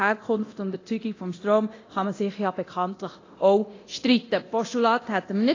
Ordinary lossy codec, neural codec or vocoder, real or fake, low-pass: AAC, 32 kbps; codec, 24 kHz, 0.9 kbps, DualCodec; fake; 7.2 kHz